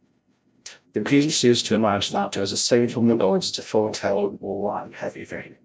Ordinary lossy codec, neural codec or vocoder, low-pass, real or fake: none; codec, 16 kHz, 0.5 kbps, FreqCodec, larger model; none; fake